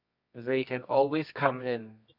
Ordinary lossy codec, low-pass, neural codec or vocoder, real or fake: none; 5.4 kHz; codec, 24 kHz, 0.9 kbps, WavTokenizer, medium music audio release; fake